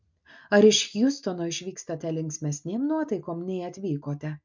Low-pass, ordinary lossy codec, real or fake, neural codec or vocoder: 7.2 kHz; MP3, 64 kbps; real; none